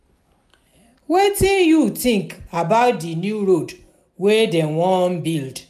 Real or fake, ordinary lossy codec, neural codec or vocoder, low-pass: real; none; none; 14.4 kHz